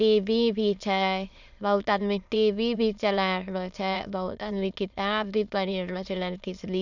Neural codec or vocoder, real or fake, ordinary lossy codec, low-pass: autoencoder, 22.05 kHz, a latent of 192 numbers a frame, VITS, trained on many speakers; fake; none; 7.2 kHz